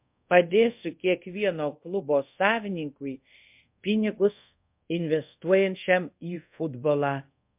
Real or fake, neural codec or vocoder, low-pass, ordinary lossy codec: fake; codec, 24 kHz, 0.5 kbps, DualCodec; 3.6 kHz; MP3, 32 kbps